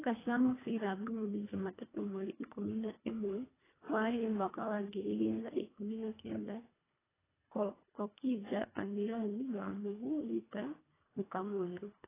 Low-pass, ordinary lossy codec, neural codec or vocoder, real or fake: 3.6 kHz; AAC, 16 kbps; codec, 24 kHz, 1.5 kbps, HILCodec; fake